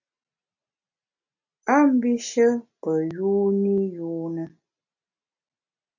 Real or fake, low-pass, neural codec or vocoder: real; 7.2 kHz; none